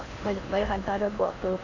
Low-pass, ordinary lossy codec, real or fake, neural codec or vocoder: 7.2 kHz; none; fake; codec, 16 kHz in and 24 kHz out, 0.8 kbps, FocalCodec, streaming, 65536 codes